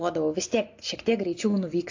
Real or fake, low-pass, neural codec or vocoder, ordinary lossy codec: real; 7.2 kHz; none; AAC, 48 kbps